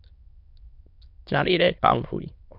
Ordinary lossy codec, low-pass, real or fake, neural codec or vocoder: MP3, 48 kbps; 5.4 kHz; fake; autoencoder, 22.05 kHz, a latent of 192 numbers a frame, VITS, trained on many speakers